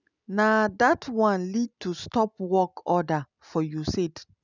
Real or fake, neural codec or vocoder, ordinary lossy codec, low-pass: real; none; none; 7.2 kHz